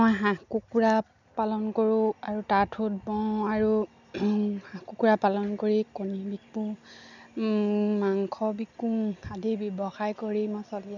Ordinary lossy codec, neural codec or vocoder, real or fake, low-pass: none; none; real; 7.2 kHz